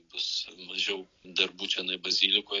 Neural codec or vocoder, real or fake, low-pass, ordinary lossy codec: none; real; 7.2 kHz; AAC, 32 kbps